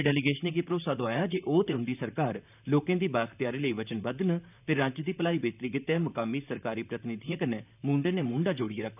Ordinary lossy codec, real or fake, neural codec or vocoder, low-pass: none; fake; vocoder, 44.1 kHz, 128 mel bands, Pupu-Vocoder; 3.6 kHz